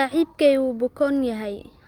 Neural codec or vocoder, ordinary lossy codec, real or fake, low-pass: none; Opus, 32 kbps; real; 19.8 kHz